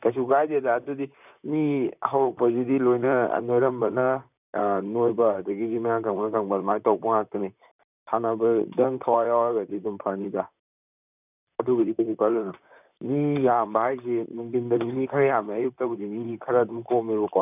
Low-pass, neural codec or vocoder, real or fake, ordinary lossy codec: 3.6 kHz; vocoder, 44.1 kHz, 128 mel bands, Pupu-Vocoder; fake; none